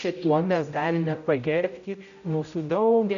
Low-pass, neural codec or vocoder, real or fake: 7.2 kHz; codec, 16 kHz, 0.5 kbps, X-Codec, HuBERT features, trained on general audio; fake